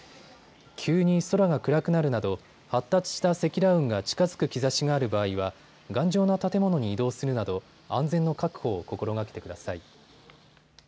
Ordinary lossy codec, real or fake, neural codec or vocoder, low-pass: none; real; none; none